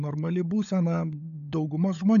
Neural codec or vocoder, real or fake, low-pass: codec, 16 kHz, 16 kbps, FunCodec, trained on LibriTTS, 50 frames a second; fake; 7.2 kHz